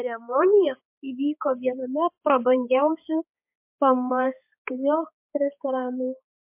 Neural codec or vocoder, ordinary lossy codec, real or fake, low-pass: codec, 16 kHz, 4 kbps, X-Codec, HuBERT features, trained on balanced general audio; MP3, 32 kbps; fake; 3.6 kHz